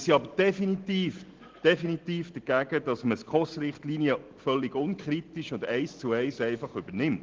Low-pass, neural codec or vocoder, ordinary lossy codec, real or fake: 7.2 kHz; none; Opus, 16 kbps; real